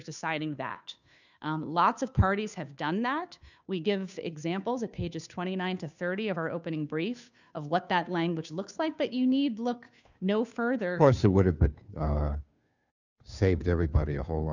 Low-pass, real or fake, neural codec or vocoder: 7.2 kHz; fake; codec, 16 kHz, 2 kbps, FunCodec, trained on Chinese and English, 25 frames a second